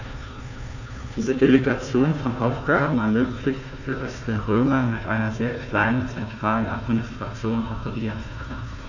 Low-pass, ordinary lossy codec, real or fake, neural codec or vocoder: 7.2 kHz; none; fake; codec, 16 kHz, 1 kbps, FunCodec, trained on Chinese and English, 50 frames a second